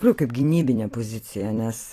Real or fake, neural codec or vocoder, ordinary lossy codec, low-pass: fake; vocoder, 44.1 kHz, 128 mel bands every 256 samples, BigVGAN v2; AAC, 48 kbps; 14.4 kHz